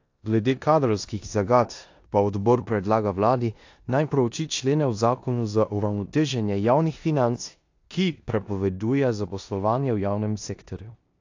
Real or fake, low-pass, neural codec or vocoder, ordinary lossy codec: fake; 7.2 kHz; codec, 16 kHz in and 24 kHz out, 0.9 kbps, LongCat-Audio-Codec, four codebook decoder; AAC, 48 kbps